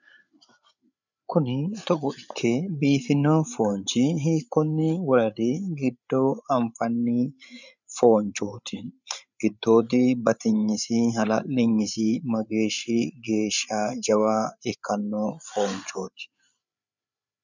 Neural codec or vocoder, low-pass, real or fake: codec, 16 kHz, 8 kbps, FreqCodec, larger model; 7.2 kHz; fake